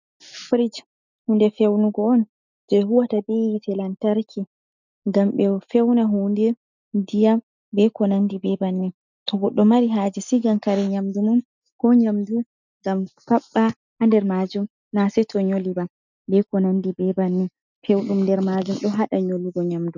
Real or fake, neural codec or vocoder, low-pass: real; none; 7.2 kHz